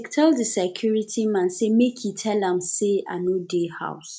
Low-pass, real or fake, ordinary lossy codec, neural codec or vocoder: none; real; none; none